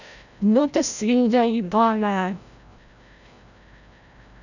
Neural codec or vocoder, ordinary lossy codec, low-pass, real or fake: codec, 16 kHz, 0.5 kbps, FreqCodec, larger model; none; 7.2 kHz; fake